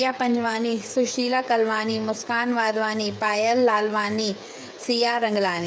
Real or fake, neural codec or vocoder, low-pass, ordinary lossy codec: fake; codec, 16 kHz, 8 kbps, FreqCodec, smaller model; none; none